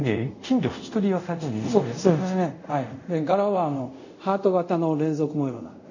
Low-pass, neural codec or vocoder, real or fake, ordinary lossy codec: 7.2 kHz; codec, 24 kHz, 0.5 kbps, DualCodec; fake; none